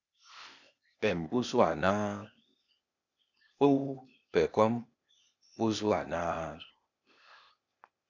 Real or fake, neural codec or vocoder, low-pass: fake; codec, 16 kHz, 0.8 kbps, ZipCodec; 7.2 kHz